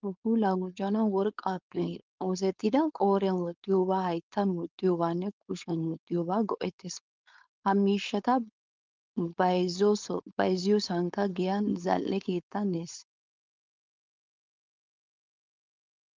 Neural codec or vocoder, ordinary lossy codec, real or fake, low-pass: codec, 16 kHz, 4.8 kbps, FACodec; Opus, 24 kbps; fake; 7.2 kHz